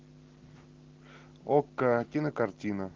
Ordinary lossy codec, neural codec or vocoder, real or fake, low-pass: Opus, 16 kbps; none; real; 7.2 kHz